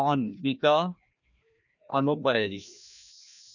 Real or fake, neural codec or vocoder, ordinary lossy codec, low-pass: fake; codec, 16 kHz, 1 kbps, FreqCodec, larger model; none; 7.2 kHz